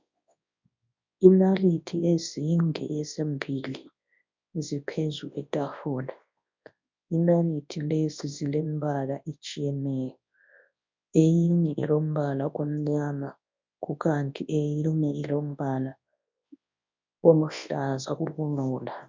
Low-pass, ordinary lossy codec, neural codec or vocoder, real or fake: 7.2 kHz; MP3, 64 kbps; codec, 24 kHz, 0.9 kbps, WavTokenizer, large speech release; fake